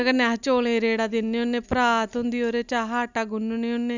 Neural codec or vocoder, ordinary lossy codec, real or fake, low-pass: none; none; real; 7.2 kHz